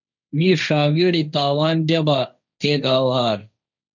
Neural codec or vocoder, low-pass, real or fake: codec, 16 kHz, 1.1 kbps, Voila-Tokenizer; 7.2 kHz; fake